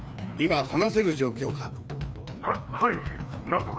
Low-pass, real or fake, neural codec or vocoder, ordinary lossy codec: none; fake; codec, 16 kHz, 2 kbps, FreqCodec, larger model; none